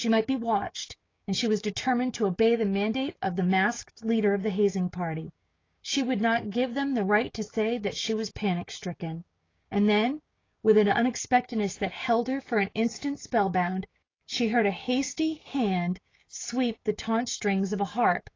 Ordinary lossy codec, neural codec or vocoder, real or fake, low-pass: AAC, 32 kbps; codec, 16 kHz, 6 kbps, DAC; fake; 7.2 kHz